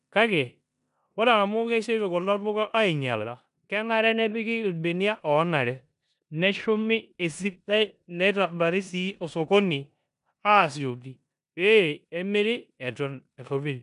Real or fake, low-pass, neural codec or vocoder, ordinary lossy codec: fake; 10.8 kHz; codec, 16 kHz in and 24 kHz out, 0.9 kbps, LongCat-Audio-Codec, four codebook decoder; none